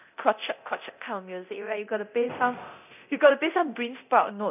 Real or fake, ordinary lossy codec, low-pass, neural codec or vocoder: fake; none; 3.6 kHz; codec, 24 kHz, 0.9 kbps, DualCodec